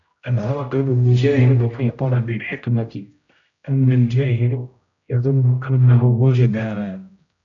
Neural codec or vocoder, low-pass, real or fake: codec, 16 kHz, 0.5 kbps, X-Codec, HuBERT features, trained on general audio; 7.2 kHz; fake